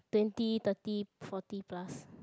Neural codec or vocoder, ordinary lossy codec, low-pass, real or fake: none; none; none; real